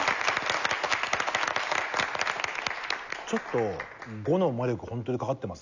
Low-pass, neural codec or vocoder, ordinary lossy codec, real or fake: 7.2 kHz; none; none; real